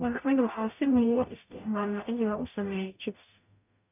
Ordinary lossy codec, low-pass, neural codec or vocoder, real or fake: none; 3.6 kHz; codec, 44.1 kHz, 0.9 kbps, DAC; fake